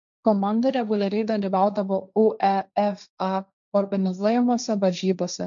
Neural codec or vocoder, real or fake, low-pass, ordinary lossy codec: codec, 16 kHz, 1.1 kbps, Voila-Tokenizer; fake; 7.2 kHz; MP3, 96 kbps